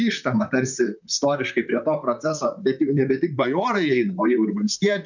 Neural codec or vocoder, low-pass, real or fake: vocoder, 44.1 kHz, 128 mel bands, Pupu-Vocoder; 7.2 kHz; fake